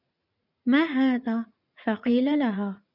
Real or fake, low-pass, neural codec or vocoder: fake; 5.4 kHz; vocoder, 44.1 kHz, 80 mel bands, Vocos